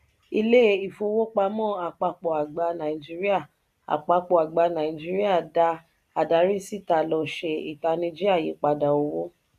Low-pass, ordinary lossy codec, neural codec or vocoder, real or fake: 14.4 kHz; none; none; real